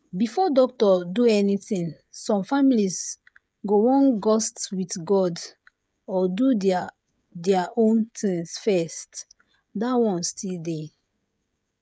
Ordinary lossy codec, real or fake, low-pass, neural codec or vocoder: none; fake; none; codec, 16 kHz, 16 kbps, FreqCodec, smaller model